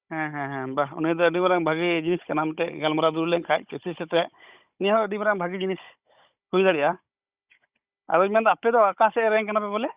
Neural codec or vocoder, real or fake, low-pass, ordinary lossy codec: codec, 16 kHz, 16 kbps, FunCodec, trained on Chinese and English, 50 frames a second; fake; 3.6 kHz; Opus, 64 kbps